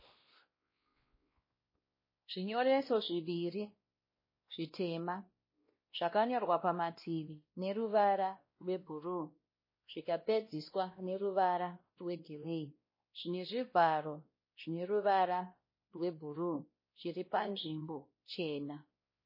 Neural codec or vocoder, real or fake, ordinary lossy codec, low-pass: codec, 16 kHz, 1 kbps, X-Codec, WavLM features, trained on Multilingual LibriSpeech; fake; MP3, 24 kbps; 5.4 kHz